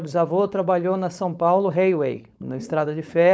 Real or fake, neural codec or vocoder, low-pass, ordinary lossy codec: fake; codec, 16 kHz, 4.8 kbps, FACodec; none; none